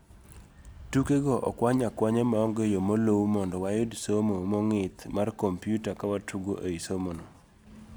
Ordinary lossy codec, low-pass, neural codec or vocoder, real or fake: none; none; none; real